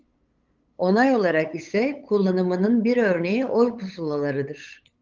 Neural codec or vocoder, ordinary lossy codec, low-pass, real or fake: codec, 16 kHz, 8 kbps, FunCodec, trained on LibriTTS, 25 frames a second; Opus, 32 kbps; 7.2 kHz; fake